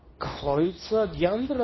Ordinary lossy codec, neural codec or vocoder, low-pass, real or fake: MP3, 24 kbps; codec, 24 kHz, 0.9 kbps, WavTokenizer, medium speech release version 2; 7.2 kHz; fake